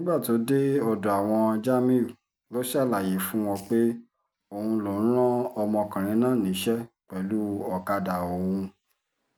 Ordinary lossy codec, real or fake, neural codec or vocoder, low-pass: none; real; none; none